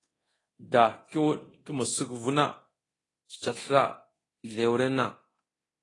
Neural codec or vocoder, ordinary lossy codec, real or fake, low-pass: codec, 24 kHz, 0.9 kbps, DualCodec; AAC, 32 kbps; fake; 10.8 kHz